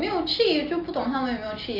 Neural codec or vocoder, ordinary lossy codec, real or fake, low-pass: none; none; real; 5.4 kHz